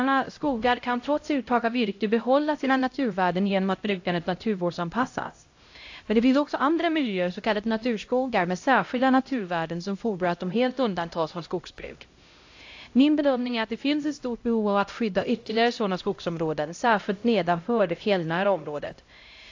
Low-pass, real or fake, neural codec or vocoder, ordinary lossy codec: 7.2 kHz; fake; codec, 16 kHz, 0.5 kbps, X-Codec, HuBERT features, trained on LibriSpeech; AAC, 48 kbps